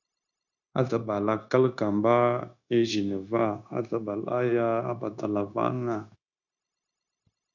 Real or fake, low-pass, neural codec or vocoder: fake; 7.2 kHz; codec, 16 kHz, 0.9 kbps, LongCat-Audio-Codec